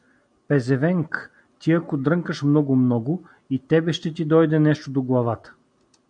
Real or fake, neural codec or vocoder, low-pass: real; none; 9.9 kHz